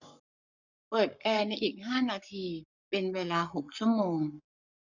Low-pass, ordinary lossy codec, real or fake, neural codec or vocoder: 7.2 kHz; none; fake; codec, 44.1 kHz, 7.8 kbps, Pupu-Codec